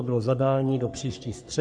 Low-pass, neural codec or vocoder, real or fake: 9.9 kHz; codec, 44.1 kHz, 3.4 kbps, Pupu-Codec; fake